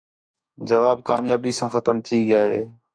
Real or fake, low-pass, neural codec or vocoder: fake; 9.9 kHz; codec, 44.1 kHz, 2.6 kbps, DAC